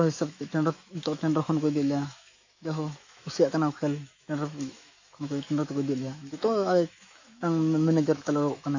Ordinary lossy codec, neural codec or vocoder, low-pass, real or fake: AAC, 48 kbps; autoencoder, 48 kHz, 128 numbers a frame, DAC-VAE, trained on Japanese speech; 7.2 kHz; fake